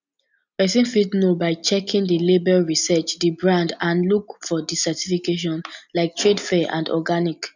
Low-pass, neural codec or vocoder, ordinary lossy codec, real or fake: 7.2 kHz; none; none; real